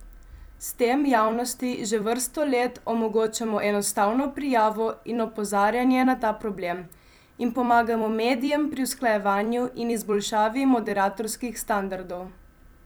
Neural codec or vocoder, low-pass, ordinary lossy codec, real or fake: vocoder, 44.1 kHz, 128 mel bands every 256 samples, BigVGAN v2; none; none; fake